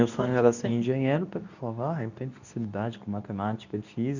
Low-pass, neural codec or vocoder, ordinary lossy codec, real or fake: 7.2 kHz; codec, 24 kHz, 0.9 kbps, WavTokenizer, medium speech release version 2; none; fake